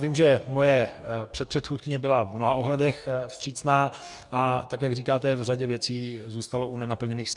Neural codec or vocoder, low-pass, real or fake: codec, 44.1 kHz, 2.6 kbps, DAC; 10.8 kHz; fake